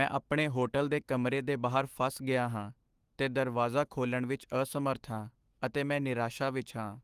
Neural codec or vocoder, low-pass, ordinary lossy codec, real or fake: autoencoder, 48 kHz, 128 numbers a frame, DAC-VAE, trained on Japanese speech; 14.4 kHz; Opus, 24 kbps; fake